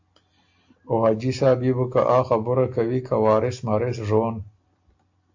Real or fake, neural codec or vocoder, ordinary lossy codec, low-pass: real; none; AAC, 48 kbps; 7.2 kHz